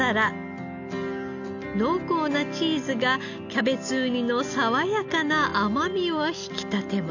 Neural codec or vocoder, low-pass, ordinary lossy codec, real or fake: none; 7.2 kHz; none; real